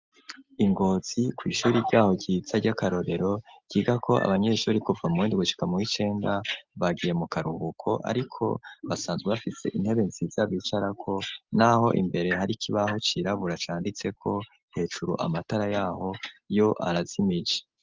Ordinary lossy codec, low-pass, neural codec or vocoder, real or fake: Opus, 32 kbps; 7.2 kHz; none; real